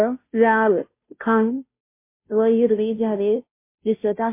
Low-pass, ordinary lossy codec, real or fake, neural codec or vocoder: 3.6 kHz; MP3, 24 kbps; fake; codec, 16 kHz, 0.5 kbps, FunCodec, trained on Chinese and English, 25 frames a second